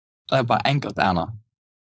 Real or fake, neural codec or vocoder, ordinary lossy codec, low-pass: fake; codec, 16 kHz, 4.8 kbps, FACodec; none; none